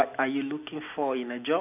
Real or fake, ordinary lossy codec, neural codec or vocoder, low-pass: real; none; none; 3.6 kHz